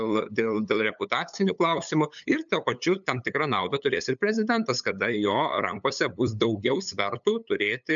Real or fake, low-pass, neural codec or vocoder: fake; 7.2 kHz; codec, 16 kHz, 8 kbps, FunCodec, trained on LibriTTS, 25 frames a second